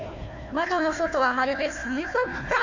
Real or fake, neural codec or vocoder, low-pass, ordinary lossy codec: fake; codec, 16 kHz, 1 kbps, FunCodec, trained on Chinese and English, 50 frames a second; 7.2 kHz; AAC, 48 kbps